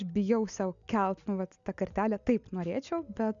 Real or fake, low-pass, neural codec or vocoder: real; 7.2 kHz; none